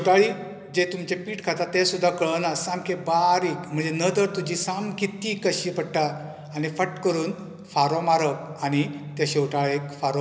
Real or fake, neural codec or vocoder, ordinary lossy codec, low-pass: real; none; none; none